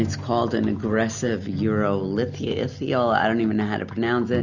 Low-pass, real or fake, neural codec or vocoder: 7.2 kHz; real; none